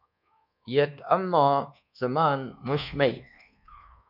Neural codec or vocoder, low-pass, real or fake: codec, 24 kHz, 1.2 kbps, DualCodec; 5.4 kHz; fake